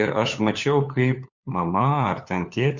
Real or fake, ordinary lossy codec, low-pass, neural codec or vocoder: fake; Opus, 64 kbps; 7.2 kHz; codec, 16 kHz, 4 kbps, FunCodec, trained on LibriTTS, 50 frames a second